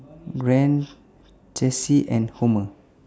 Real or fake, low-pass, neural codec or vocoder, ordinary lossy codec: real; none; none; none